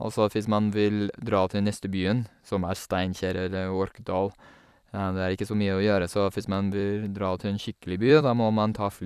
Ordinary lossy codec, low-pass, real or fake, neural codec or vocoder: none; 14.4 kHz; fake; vocoder, 44.1 kHz, 128 mel bands every 256 samples, BigVGAN v2